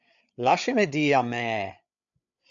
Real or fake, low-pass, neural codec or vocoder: fake; 7.2 kHz; codec, 16 kHz, 8 kbps, FreqCodec, larger model